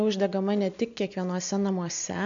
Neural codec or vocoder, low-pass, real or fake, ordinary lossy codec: none; 7.2 kHz; real; MP3, 64 kbps